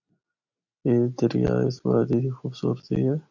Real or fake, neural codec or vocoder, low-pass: real; none; 7.2 kHz